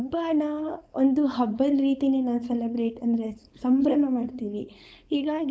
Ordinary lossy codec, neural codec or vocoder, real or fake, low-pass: none; codec, 16 kHz, 4.8 kbps, FACodec; fake; none